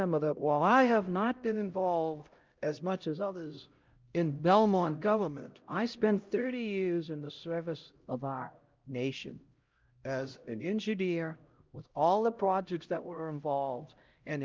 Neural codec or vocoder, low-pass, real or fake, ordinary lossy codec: codec, 16 kHz, 0.5 kbps, X-Codec, HuBERT features, trained on LibriSpeech; 7.2 kHz; fake; Opus, 24 kbps